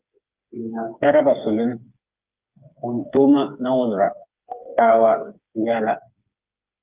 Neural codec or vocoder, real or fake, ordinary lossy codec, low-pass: codec, 16 kHz, 4 kbps, FreqCodec, smaller model; fake; Opus, 32 kbps; 3.6 kHz